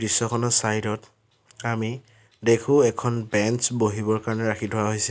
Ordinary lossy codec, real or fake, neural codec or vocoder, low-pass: none; real; none; none